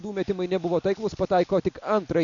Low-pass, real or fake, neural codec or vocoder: 7.2 kHz; real; none